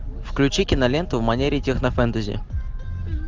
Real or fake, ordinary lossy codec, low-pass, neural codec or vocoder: real; Opus, 32 kbps; 7.2 kHz; none